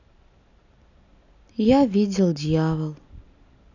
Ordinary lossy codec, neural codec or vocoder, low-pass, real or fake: none; none; 7.2 kHz; real